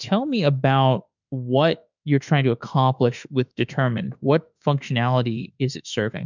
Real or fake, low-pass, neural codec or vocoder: fake; 7.2 kHz; autoencoder, 48 kHz, 32 numbers a frame, DAC-VAE, trained on Japanese speech